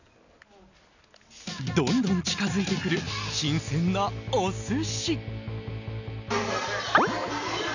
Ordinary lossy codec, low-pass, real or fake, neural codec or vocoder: none; 7.2 kHz; real; none